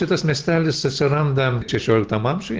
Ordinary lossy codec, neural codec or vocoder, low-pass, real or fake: Opus, 16 kbps; none; 7.2 kHz; real